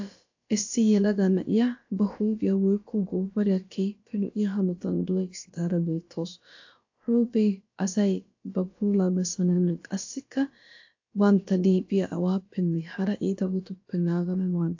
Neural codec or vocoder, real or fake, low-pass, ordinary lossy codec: codec, 16 kHz, about 1 kbps, DyCAST, with the encoder's durations; fake; 7.2 kHz; AAC, 48 kbps